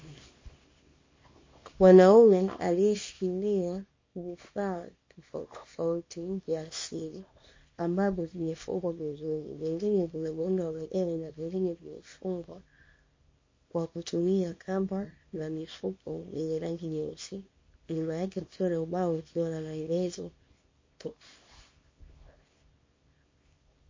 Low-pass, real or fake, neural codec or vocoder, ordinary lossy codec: 7.2 kHz; fake; codec, 24 kHz, 0.9 kbps, WavTokenizer, small release; MP3, 32 kbps